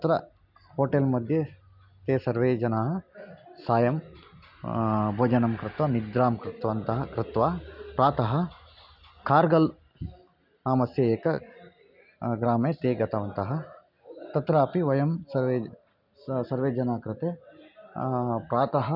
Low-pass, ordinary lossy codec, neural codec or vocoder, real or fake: 5.4 kHz; none; none; real